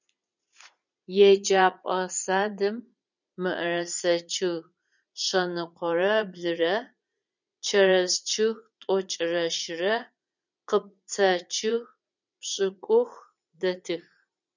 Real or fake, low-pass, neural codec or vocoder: fake; 7.2 kHz; vocoder, 44.1 kHz, 80 mel bands, Vocos